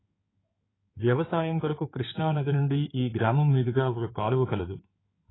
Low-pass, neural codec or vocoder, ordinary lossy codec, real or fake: 7.2 kHz; codec, 16 kHz in and 24 kHz out, 2.2 kbps, FireRedTTS-2 codec; AAC, 16 kbps; fake